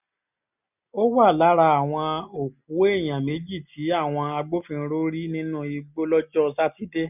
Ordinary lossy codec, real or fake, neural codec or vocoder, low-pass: none; real; none; 3.6 kHz